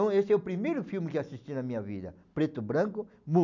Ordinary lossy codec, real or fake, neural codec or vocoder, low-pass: none; real; none; 7.2 kHz